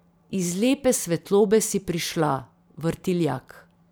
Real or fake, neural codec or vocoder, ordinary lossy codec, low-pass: fake; vocoder, 44.1 kHz, 128 mel bands every 512 samples, BigVGAN v2; none; none